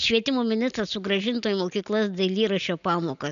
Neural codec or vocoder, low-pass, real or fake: none; 7.2 kHz; real